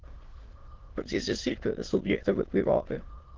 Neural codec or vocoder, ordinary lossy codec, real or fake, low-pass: autoencoder, 22.05 kHz, a latent of 192 numbers a frame, VITS, trained on many speakers; Opus, 16 kbps; fake; 7.2 kHz